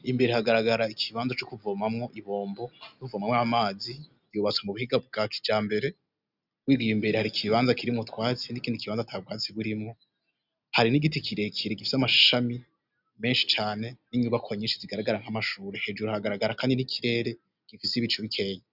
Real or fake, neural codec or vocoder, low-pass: real; none; 5.4 kHz